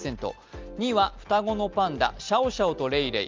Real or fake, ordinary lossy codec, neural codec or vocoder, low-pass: real; Opus, 24 kbps; none; 7.2 kHz